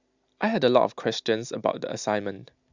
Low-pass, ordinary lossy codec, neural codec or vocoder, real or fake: 7.2 kHz; Opus, 64 kbps; none; real